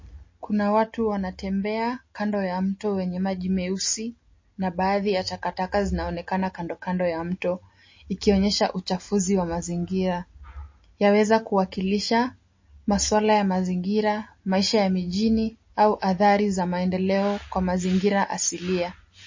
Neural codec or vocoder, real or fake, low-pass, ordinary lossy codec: none; real; 7.2 kHz; MP3, 32 kbps